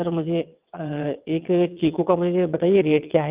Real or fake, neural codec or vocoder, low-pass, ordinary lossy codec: fake; vocoder, 22.05 kHz, 80 mel bands, WaveNeXt; 3.6 kHz; Opus, 24 kbps